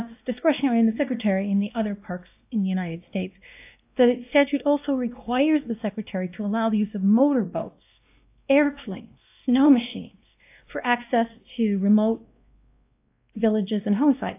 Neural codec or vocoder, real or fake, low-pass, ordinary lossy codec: codec, 16 kHz, 1 kbps, X-Codec, WavLM features, trained on Multilingual LibriSpeech; fake; 3.6 kHz; AAC, 32 kbps